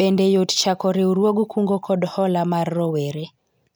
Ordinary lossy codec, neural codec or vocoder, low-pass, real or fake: none; none; none; real